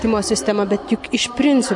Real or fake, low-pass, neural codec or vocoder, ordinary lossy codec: real; 10.8 kHz; none; MP3, 64 kbps